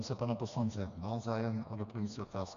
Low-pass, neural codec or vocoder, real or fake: 7.2 kHz; codec, 16 kHz, 2 kbps, FreqCodec, smaller model; fake